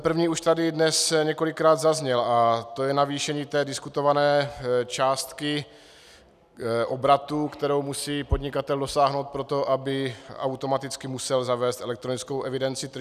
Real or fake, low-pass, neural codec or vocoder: real; 14.4 kHz; none